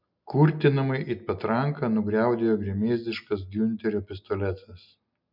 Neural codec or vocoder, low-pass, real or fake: none; 5.4 kHz; real